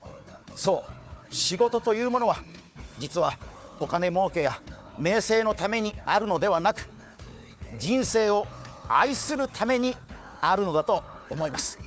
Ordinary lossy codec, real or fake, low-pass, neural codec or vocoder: none; fake; none; codec, 16 kHz, 4 kbps, FunCodec, trained on Chinese and English, 50 frames a second